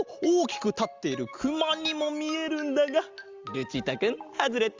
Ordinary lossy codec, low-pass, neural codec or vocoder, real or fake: Opus, 32 kbps; 7.2 kHz; none; real